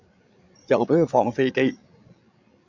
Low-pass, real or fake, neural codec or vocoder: 7.2 kHz; fake; codec, 16 kHz, 16 kbps, FreqCodec, larger model